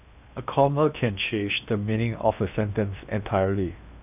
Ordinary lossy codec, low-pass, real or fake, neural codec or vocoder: none; 3.6 kHz; fake; codec, 16 kHz in and 24 kHz out, 0.8 kbps, FocalCodec, streaming, 65536 codes